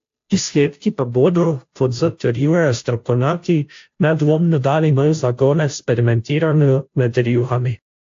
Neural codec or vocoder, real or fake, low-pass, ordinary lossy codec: codec, 16 kHz, 0.5 kbps, FunCodec, trained on Chinese and English, 25 frames a second; fake; 7.2 kHz; AAC, 48 kbps